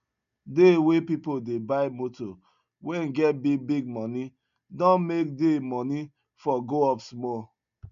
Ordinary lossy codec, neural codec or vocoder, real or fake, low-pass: none; none; real; 7.2 kHz